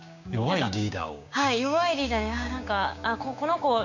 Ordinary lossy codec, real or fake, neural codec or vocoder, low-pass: none; fake; codec, 16 kHz, 6 kbps, DAC; 7.2 kHz